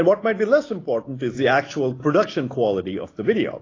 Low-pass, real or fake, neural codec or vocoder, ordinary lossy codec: 7.2 kHz; real; none; AAC, 32 kbps